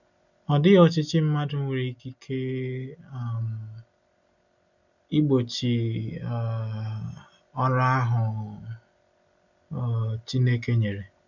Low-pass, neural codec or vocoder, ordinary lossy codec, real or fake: 7.2 kHz; none; none; real